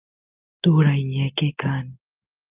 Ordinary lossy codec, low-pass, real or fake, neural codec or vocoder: Opus, 32 kbps; 3.6 kHz; real; none